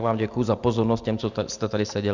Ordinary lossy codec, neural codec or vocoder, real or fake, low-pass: Opus, 64 kbps; none; real; 7.2 kHz